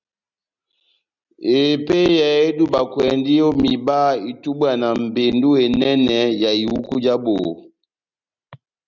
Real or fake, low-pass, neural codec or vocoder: real; 7.2 kHz; none